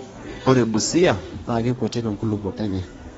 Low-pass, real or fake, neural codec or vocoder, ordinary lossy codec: 14.4 kHz; fake; codec, 32 kHz, 1.9 kbps, SNAC; AAC, 24 kbps